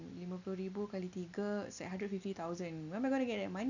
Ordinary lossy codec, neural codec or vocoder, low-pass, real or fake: none; none; 7.2 kHz; real